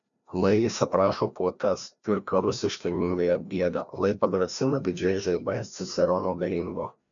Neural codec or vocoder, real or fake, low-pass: codec, 16 kHz, 1 kbps, FreqCodec, larger model; fake; 7.2 kHz